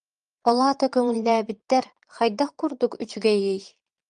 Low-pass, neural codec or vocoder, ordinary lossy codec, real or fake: 9.9 kHz; vocoder, 22.05 kHz, 80 mel bands, Vocos; Opus, 32 kbps; fake